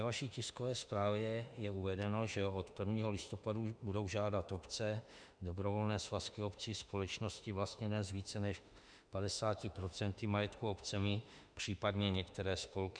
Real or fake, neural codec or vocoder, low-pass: fake; autoencoder, 48 kHz, 32 numbers a frame, DAC-VAE, trained on Japanese speech; 9.9 kHz